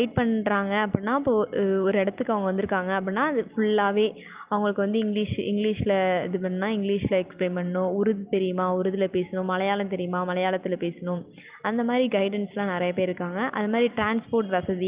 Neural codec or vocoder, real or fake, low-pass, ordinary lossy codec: none; real; 3.6 kHz; Opus, 24 kbps